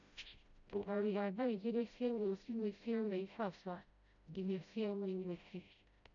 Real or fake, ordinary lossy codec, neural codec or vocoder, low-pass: fake; none; codec, 16 kHz, 0.5 kbps, FreqCodec, smaller model; 7.2 kHz